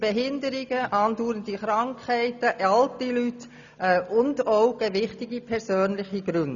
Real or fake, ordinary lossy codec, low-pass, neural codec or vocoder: real; none; 7.2 kHz; none